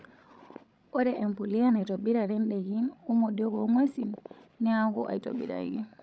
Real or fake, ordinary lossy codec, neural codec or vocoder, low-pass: fake; none; codec, 16 kHz, 16 kbps, FreqCodec, larger model; none